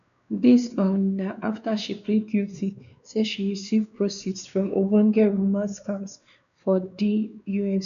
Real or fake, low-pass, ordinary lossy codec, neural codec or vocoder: fake; 7.2 kHz; none; codec, 16 kHz, 2 kbps, X-Codec, WavLM features, trained on Multilingual LibriSpeech